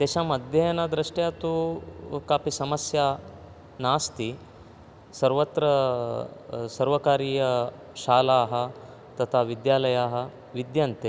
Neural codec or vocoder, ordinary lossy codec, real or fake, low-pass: none; none; real; none